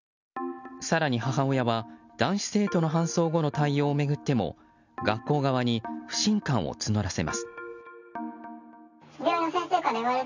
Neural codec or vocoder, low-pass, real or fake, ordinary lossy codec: none; 7.2 kHz; real; none